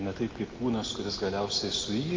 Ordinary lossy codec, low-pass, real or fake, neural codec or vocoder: Opus, 32 kbps; 7.2 kHz; real; none